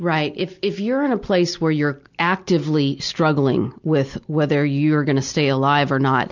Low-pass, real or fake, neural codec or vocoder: 7.2 kHz; real; none